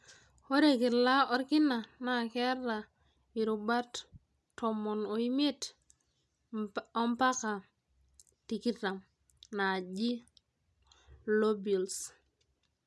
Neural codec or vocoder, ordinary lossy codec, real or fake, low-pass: none; none; real; none